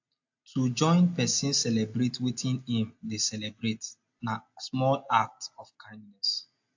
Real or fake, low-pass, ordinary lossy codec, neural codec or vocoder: real; 7.2 kHz; AAC, 48 kbps; none